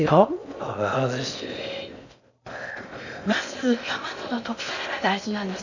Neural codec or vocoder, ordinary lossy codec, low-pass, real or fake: codec, 16 kHz in and 24 kHz out, 0.6 kbps, FocalCodec, streaming, 4096 codes; none; 7.2 kHz; fake